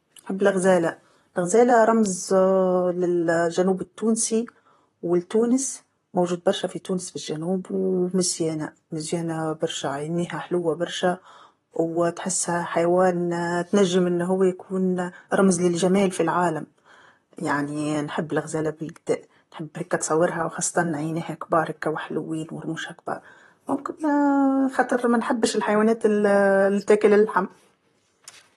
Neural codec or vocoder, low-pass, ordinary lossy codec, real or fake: vocoder, 44.1 kHz, 128 mel bands, Pupu-Vocoder; 19.8 kHz; AAC, 32 kbps; fake